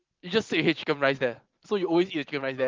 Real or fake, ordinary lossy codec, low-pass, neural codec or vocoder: fake; Opus, 32 kbps; 7.2 kHz; vocoder, 44.1 kHz, 128 mel bands every 512 samples, BigVGAN v2